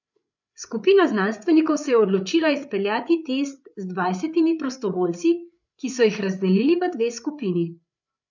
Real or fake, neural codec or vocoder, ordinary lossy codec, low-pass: fake; codec, 16 kHz, 8 kbps, FreqCodec, larger model; none; 7.2 kHz